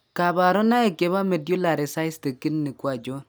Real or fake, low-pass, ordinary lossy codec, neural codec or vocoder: real; none; none; none